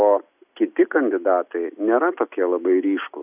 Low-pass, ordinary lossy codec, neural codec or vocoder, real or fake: 3.6 kHz; AAC, 32 kbps; none; real